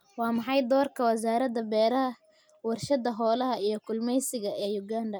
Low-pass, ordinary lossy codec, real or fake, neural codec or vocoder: none; none; real; none